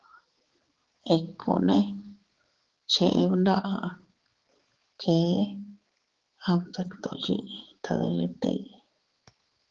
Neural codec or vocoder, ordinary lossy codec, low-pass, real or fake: codec, 16 kHz, 4 kbps, X-Codec, HuBERT features, trained on balanced general audio; Opus, 16 kbps; 7.2 kHz; fake